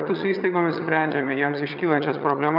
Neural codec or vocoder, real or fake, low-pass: vocoder, 22.05 kHz, 80 mel bands, HiFi-GAN; fake; 5.4 kHz